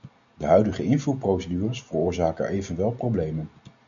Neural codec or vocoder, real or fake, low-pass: none; real; 7.2 kHz